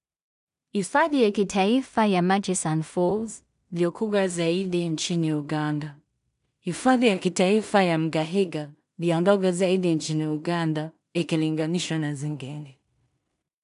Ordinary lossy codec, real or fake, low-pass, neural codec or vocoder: none; fake; 10.8 kHz; codec, 16 kHz in and 24 kHz out, 0.4 kbps, LongCat-Audio-Codec, two codebook decoder